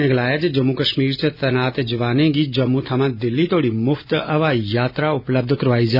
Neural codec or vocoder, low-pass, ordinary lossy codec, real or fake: none; 5.4 kHz; none; real